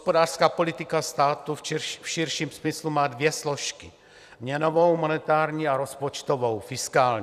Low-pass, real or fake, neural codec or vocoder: 14.4 kHz; fake; vocoder, 44.1 kHz, 128 mel bands every 256 samples, BigVGAN v2